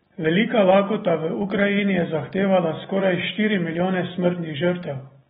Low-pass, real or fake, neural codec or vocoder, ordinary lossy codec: 7.2 kHz; real; none; AAC, 16 kbps